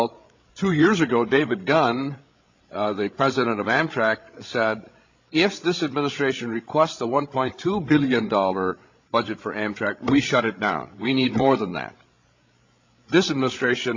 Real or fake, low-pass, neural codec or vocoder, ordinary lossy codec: fake; 7.2 kHz; codec, 16 kHz, 16 kbps, FreqCodec, larger model; AAC, 48 kbps